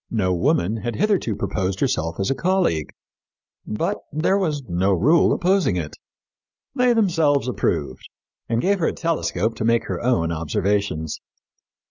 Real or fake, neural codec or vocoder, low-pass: real; none; 7.2 kHz